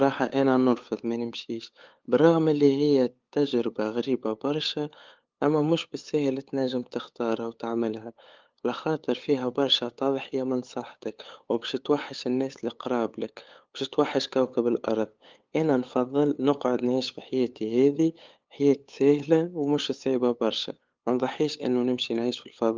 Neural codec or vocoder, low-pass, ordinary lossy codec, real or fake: codec, 16 kHz, 8 kbps, FunCodec, trained on LibriTTS, 25 frames a second; 7.2 kHz; Opus, 32 kbps; fake